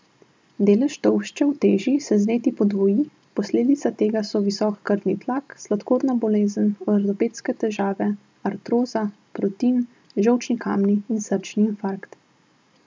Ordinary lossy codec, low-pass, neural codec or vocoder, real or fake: none; none; none; real